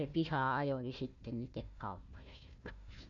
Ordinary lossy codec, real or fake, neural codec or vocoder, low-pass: none; fake; codec, 16 kHz, 1 kbps, FunCodec, trained on Chinese and English, 50 frames a second; 7.2 kHz